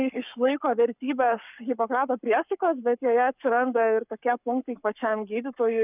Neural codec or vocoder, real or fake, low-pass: codec, 44.1 kHz, 7.8 kbps, Pupu-Codec; fake; 3.6 kHz